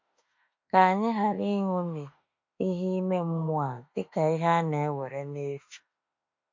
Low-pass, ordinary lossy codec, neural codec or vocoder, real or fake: 7.2 kHz; MP3, 48 kbps; autoencoder, 48 kHz, 32 numbers a frame, DAC-VAE, trained on Japanese speech; fake